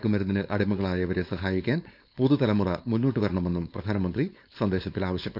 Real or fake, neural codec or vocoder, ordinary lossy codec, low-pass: fake; codec, 16 kHz, 4.8 kbps, FACodec; none; 5.4 kHz